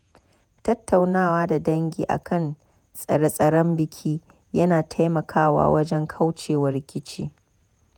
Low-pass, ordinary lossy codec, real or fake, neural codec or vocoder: 19.8 kHz; none; fake; vocoder, 48 kHz, 128 mel bands, Vocos